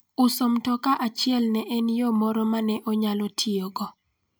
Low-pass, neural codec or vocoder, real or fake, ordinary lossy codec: none; none; real; none